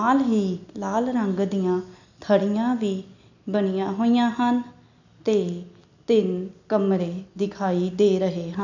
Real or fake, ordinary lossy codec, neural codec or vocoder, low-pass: real; none; none; 7.2 kHz